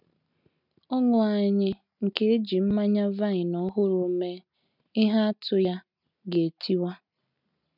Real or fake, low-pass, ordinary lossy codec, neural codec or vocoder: real; 5.4 kHz; none; none